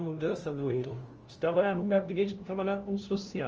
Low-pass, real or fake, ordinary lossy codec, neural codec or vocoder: 7.2 kHz; fake; Opus, 24 kbps; codec, 16 kHz, 0.5 kbps, FunCodec, trained on LibriTTS, 25 frames a second